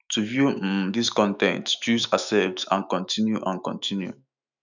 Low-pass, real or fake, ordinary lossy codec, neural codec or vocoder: 7.2 kHz; fake; none; autoencoder, 48 kHz, 128 numbers a frame, DAC-VAE, trained on Japanese speech